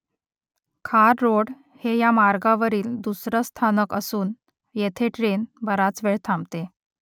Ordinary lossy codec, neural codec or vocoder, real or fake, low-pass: none; none; real; 19.8 kHz